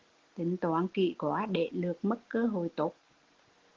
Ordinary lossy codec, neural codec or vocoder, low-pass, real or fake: Opus, 16 kbps; none; 7.2 kHz; real